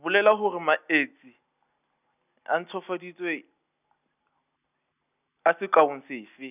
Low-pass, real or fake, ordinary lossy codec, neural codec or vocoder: 3.6 kHz; real; none; none